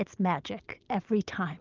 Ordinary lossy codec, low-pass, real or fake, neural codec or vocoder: Opus, 32 kbps; 7.2 kHz; real; none